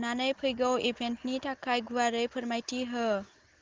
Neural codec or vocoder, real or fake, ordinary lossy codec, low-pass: none; real; Opus, 16 kbps; 7.2 kHz